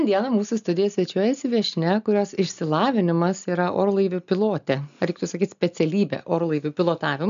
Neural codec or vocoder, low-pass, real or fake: none; 7.2 kHz; real